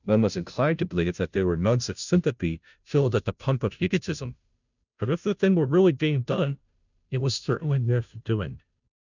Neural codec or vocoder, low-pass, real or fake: codec, 16 kHz, 0.5 kbps, FunCodec, trained on Chinese and English, 25 frames a second; 7.2 kHz; fake